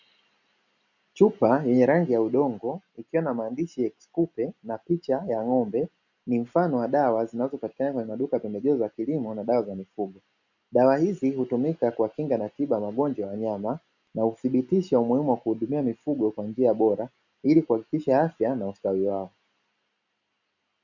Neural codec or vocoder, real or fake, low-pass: none; real; 7.2 kHz